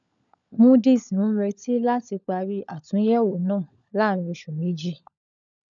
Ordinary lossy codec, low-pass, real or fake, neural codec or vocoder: none; 7.2 kHz; fake; codec, 16 kHz, 16 kbps, FunCodec, trained on LibriTTS, 50 frames a second